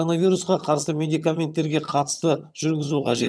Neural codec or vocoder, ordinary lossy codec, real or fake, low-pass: vocoder, 22.05 kHz, 80 mel bands, HiFi-GAN; none; fake; none